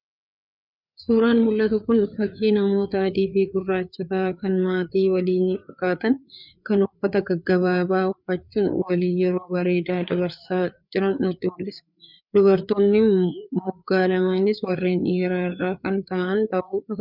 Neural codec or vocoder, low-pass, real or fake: codec, 16 kHz, 4 kbps, FreqCodec, larger model; 5.4 kHz; fake